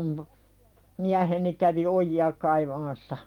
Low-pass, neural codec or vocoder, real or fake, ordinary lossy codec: 19.8 kHz; vocoder, 44.1 kHz, 128 mel bands every 256 samples, BigVGAN v2; fake; Opus, 32 kbps